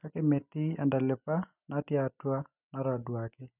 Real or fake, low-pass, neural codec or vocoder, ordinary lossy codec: real; 3.6 kHz; none; none